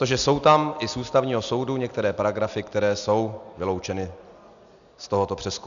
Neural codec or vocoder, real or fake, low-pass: none; real; 7.2 kHz